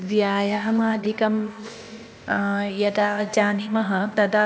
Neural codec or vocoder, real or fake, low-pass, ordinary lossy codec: codec, 16 kHz, 0.8 kbps, ZipCodec; fake; none; none